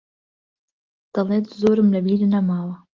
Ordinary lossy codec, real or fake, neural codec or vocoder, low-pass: Opus, 32 kbps; real; none; 7.2 kHz